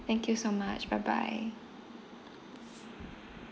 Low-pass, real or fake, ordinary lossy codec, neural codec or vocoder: none; real; none; none